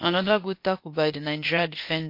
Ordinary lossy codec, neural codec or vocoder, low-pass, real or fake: MP3, 32 kbps; codec, 16 kHz, 0.8 kbps, ZipCodec; 5.4 kHz; fake